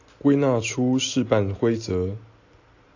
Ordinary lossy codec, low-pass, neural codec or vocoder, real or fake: AAC, 48 kbps; 7.2 kHz; none; real